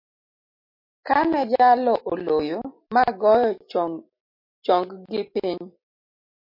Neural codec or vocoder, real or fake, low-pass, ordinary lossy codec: none; real; 5.4 kHz; MP3, 32 kbps